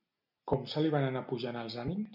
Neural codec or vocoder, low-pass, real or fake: none; 5.4 kHz; real